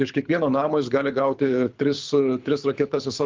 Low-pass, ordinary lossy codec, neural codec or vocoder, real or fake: 7.2 kHz; Opus, 32 kbps; codec, 24 kHz, 6 kbps, HILCodec; fake